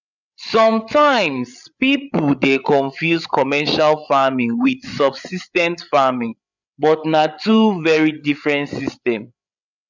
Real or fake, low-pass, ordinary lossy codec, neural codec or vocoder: fake; 7.2 kHz; none; codec, 16 kHz, 8 kbps, FreqCodec, larger model